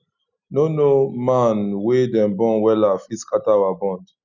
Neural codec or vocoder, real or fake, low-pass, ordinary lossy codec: none; real; 7.2 kHz; none